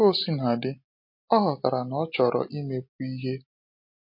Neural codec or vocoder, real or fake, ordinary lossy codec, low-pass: none; real; MP3, 32 kbps; 5.4 kHz